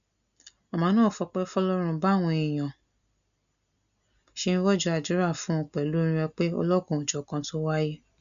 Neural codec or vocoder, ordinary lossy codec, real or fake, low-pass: none; none; real; 7.2 kHz